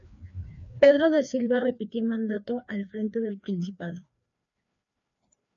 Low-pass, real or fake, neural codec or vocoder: 7.2 kHz; fake; codec, 16 kHz, 2 kbps, FreqCodec, larger model